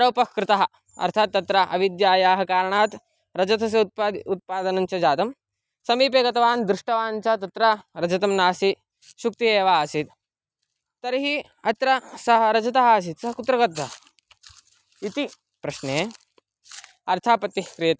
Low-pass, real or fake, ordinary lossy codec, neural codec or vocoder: none; real; none; none